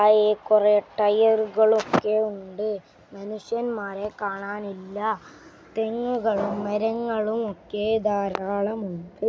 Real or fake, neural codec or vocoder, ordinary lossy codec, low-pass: real; none; none; none